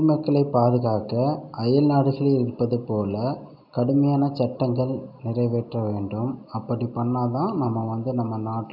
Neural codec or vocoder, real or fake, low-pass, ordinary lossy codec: none; real; 5.4 kHz; none